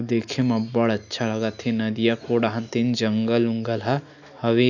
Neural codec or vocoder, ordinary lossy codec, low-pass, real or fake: none; none; 7.2 kHz; real